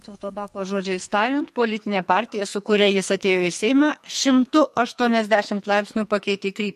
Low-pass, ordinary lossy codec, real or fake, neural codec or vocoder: 14.4 kHz; MP3, 64 kbps; fake; codec, 44.1 kHz, 2.6 kbps, SNAC